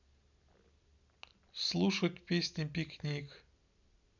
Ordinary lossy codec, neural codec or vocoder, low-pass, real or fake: none; none; 7.2 kHz; real